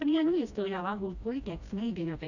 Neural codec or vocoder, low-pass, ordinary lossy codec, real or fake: codec, 16 kHz, 1 kbps, FreqCodec, smaller model; 7.2 kHz; none; fake